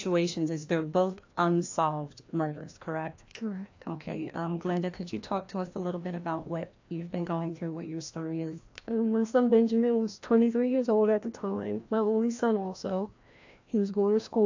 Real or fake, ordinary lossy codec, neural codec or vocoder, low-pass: fake; AAC, 48 kbps; codec, 16 kHz, 1 kbps, FreqCodec, larger model; 7.2 kHz